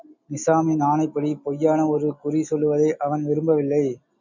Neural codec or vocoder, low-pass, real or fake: none; 7.2 kHz; real